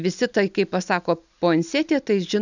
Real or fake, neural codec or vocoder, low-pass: real; none; 7.2 kHz